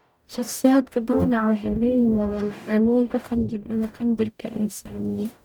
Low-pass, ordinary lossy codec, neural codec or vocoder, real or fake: 19.8 kHz; none; codec, 44.1 kHz, 0.9 kbps, DAC; fake